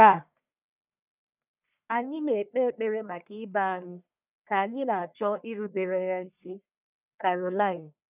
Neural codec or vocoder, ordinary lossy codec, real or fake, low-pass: codec, 44.1 kHz, 1.7 kbps, Pupu-Codec; none; fake; 3.6 kHz